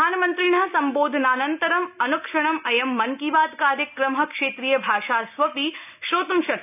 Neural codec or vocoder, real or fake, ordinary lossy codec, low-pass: none; real; none; 3.6 kHz